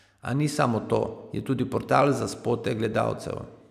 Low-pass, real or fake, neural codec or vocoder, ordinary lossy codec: 14.4 kHz; real; none; none